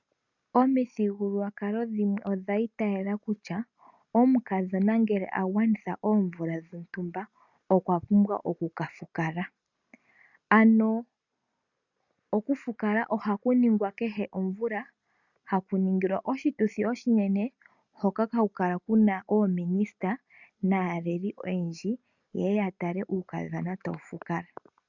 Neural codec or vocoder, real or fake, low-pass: none; real; 7.2 kHz